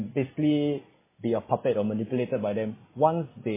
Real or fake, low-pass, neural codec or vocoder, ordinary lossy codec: real; 3.6 kHz; none; MP3, 16 kbps